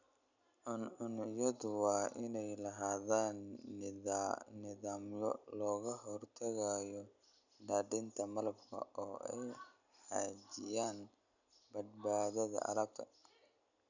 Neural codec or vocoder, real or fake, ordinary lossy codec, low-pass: none; real; none; 7.2 kHz